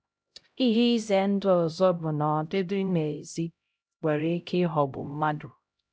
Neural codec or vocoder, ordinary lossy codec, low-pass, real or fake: codec, 16 kHz, 0.5 kbps, X-Codec, HuBERT features, trained on LibriSpeech; none; none; fake